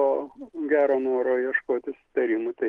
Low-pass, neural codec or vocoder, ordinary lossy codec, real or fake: 10.8 kHz; none; Opus, 16 kbps; real